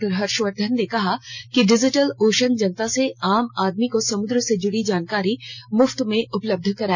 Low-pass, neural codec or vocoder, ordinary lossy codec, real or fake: 7.2 kHz; none; none; real